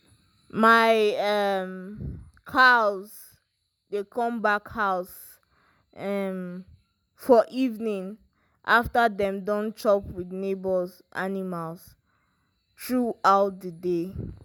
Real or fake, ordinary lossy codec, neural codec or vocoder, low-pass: real; none; none; none